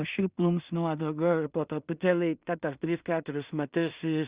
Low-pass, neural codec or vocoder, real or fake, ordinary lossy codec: 3.6 kHz; codec, 16 kHz in and 24 kHz out, 0.4 kbps, LongCat-Audio-Codec, two codebook decoder; fake; Opus, 64 kbps